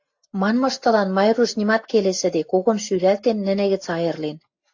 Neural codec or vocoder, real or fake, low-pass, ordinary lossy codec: none; real; 7.2 kHz; AAC, 48 kbps